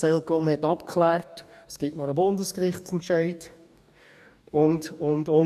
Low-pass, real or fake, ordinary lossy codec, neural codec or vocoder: 14.4 kHz; fake; none; codec, 44.1 kHz, 2.6 kbps, DAC